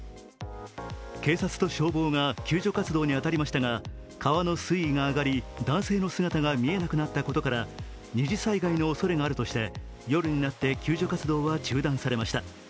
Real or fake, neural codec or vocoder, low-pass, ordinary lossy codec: real; none; none; none